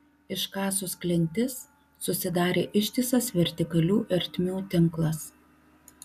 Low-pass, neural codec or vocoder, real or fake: 14.4 kHz; none; real